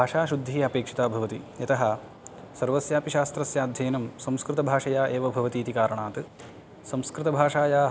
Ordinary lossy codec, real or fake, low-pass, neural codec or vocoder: none; real; none; none